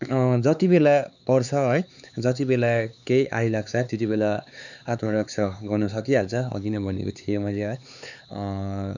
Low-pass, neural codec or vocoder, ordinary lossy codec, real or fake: 7.2 kHz; codec, 16 kHz, 4 kbps, X-Codec, HuBERT features, trained on LibriSpeech; none; fake